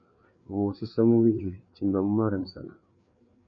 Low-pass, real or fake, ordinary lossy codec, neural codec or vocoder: 7.2 kHz; fake; MP3, 96 kbps; codec, 16 kHz, 4 kbps, FreqCodec, larger model